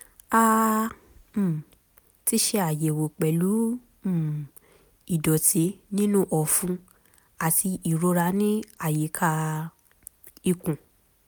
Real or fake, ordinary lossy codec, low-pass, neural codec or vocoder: real; none; none; none